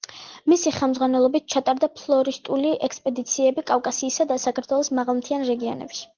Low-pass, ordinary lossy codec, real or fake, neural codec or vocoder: 7.2 kHz; Opus, 16 kbps; real; none